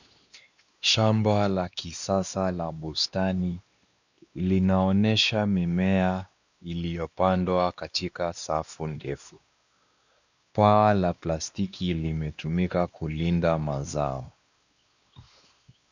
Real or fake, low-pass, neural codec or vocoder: fake; 7.2 kHz; codec, 16 kHz, 2 kbps, X-Codec, WavLM features, trained on Multilingual LibriSpeech